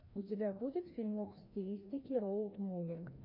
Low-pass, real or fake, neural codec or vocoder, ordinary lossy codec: 5.4 kHz; fake; codec, 16 kHz, 1 kbps, FreqCodec, larger model; MP3, 32 kbps